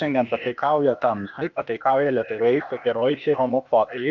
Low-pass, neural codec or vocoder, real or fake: 7.2 kHz; codec, 16 kHz, 0.8 kbps, ZipCodec; fake